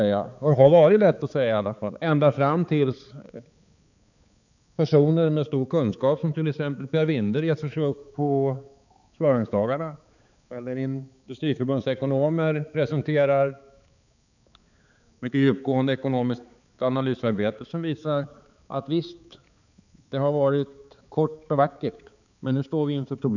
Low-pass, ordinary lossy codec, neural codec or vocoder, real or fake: 7.2 kHz; none; codec, 16 kHz, 4 kbps, X-Codec, HuBERT features, trained on balanced general audio; fake